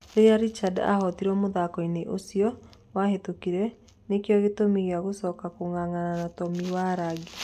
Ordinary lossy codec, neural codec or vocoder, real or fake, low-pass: AAC, 96 kbps; none; real; 14.4 kHz